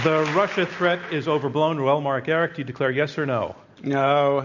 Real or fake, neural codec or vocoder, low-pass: real; none; 7.2 kHz